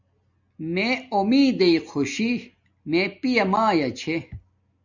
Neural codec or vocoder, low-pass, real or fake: none; 7.2 kHz; real